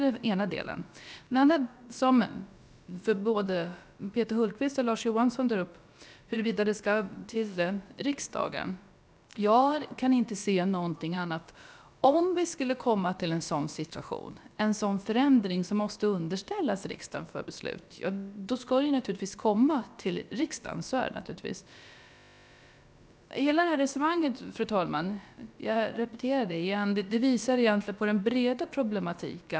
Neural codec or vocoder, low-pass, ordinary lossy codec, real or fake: codec, 16 kHz, about 1 kbps, DyCAST, with the encoder's durations; none; none; fake